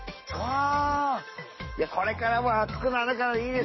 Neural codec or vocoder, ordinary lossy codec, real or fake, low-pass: codec, 44.1 kHz, 7.8 kbps, DAC; MP3, 24 kbps; fake; 7.2 kHz